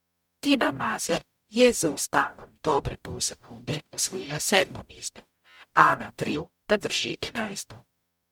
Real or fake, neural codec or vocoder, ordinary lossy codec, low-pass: fake; codec, 44.1 kHz, 0.9 kbps, DAC; MP3, 96 kbps; 19.8 kHz